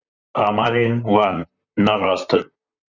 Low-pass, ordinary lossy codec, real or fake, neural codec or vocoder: 7.2 kHz; Opus, 64 kbps; fake; vocoder, 44.1 kHz, 128 mel bands, Pupu-Vocoder